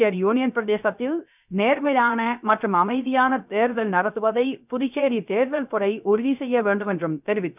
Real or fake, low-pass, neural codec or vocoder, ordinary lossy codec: fake; 3.6 kHz; codec, 16 kHz, 0.3 kbps, FocalCodec; none